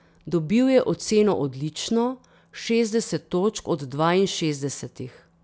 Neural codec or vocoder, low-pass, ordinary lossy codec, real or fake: none; none; none; real